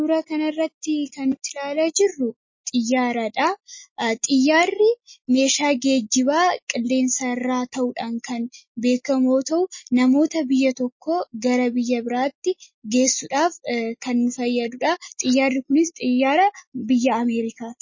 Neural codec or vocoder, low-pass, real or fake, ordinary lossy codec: none; 7.2 kHz; real; MP3, 32 kbps